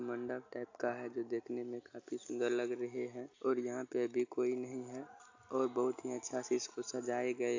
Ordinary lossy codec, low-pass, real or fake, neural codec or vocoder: AAC, 48 kbps; 7.2 kHz; real; none